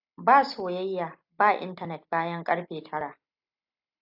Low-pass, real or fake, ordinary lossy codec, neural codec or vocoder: 5.4 kHz; real; AAC, 48 kbps; none